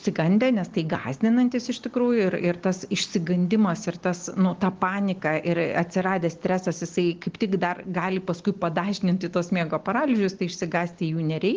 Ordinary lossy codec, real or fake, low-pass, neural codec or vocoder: Opus, 16 kbps; real; 7.2 kHz; none